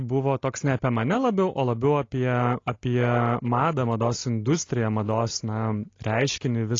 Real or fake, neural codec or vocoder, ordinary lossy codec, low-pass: real; none; AAC, 32 kbps; 7.2 kHz